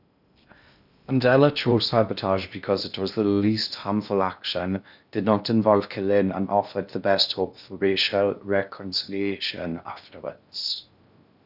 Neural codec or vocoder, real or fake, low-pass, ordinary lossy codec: codec, 16 kHz in and 24 kHz out, 0.6 kbps, FocalCodec, streaming, 2048 codes; fake; 5.4 kHz; none